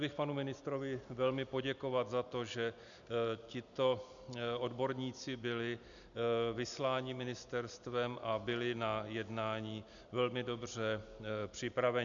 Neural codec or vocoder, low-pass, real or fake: none; 7.2 kHz; real